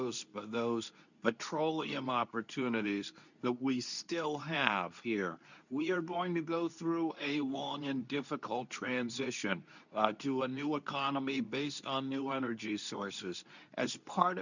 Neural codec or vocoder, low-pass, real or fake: codec, 24 kHz, 0.9 kbps, WavTokenizer, medium speech release version 2; 7.2 kHz; fake